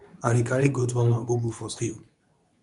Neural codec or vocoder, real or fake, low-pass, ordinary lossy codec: codec, 24 kHz, 0.9 kbps, WavTokenizer, medium speech release version 2; fake; 10.8 kHz; none